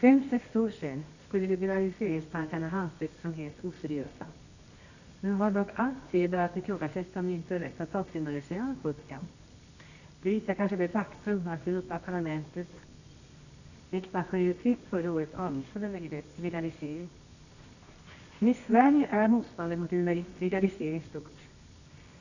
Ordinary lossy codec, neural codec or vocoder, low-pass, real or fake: none; codec, 24 kHz, 0.9 kbps, WavTokenizer, medium music audio release; 7.2 kHz; fake